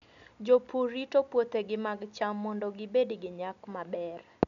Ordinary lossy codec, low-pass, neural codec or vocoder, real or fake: none; 7.2 kHz; none; real